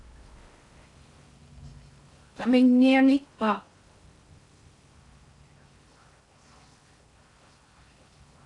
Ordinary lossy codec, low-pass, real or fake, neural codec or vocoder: AAC, 48 kbps; 10.8 kHz; fake; codec, 16 kHz in and 24 kHz out, 0.6 kbps, FocalCodec, streaming, 4096 codes